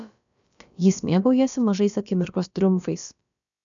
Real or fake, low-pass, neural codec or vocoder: fake; 7.2 kHz; codec, 16 kHz, about 1 kbps, DyCAST, with the encoder's durations